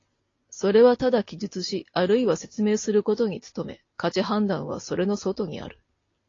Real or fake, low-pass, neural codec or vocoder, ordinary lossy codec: real; 7.2 kHz; none; AAC, 32 kbps